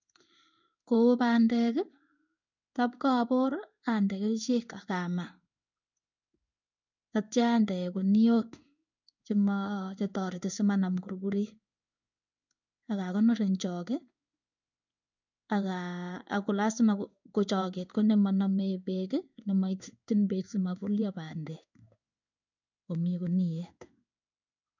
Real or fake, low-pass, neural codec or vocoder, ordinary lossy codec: fake; 7.2 kHz; codec, 16 kHz in and 24 kHz out, 1 kbps, XY-Tokenizer; none